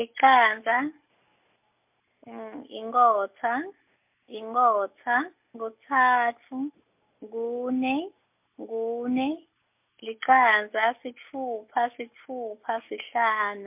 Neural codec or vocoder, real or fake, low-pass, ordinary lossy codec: none; real; 3.6 kHz; MP3, 32 kbps